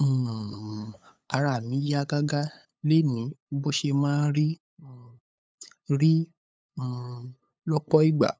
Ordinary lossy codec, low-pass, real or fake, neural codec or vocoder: none; none; fake; codec, 16 kHz, 8 kbps, FunCodec, trained on LibriTTS, 25 frames a second